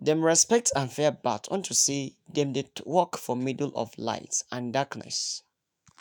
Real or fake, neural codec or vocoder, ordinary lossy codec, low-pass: fake; autoencoder, 48 kHz, 128 numbers a frame, DAC-VAE, trained on Japanese speech; none; none